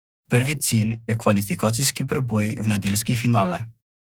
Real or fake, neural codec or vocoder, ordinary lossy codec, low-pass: fake; codec, 44.1 kHz, 2.6 kbps, DAC; none; none